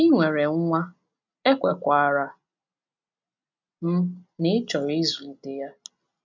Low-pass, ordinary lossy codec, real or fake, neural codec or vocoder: 7.2 kHz; MP3, 64 kbps; real; none